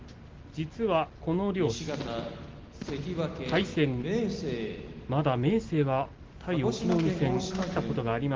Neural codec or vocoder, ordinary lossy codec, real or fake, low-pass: none; Opus, 16 kbps; real; 7.2 kHz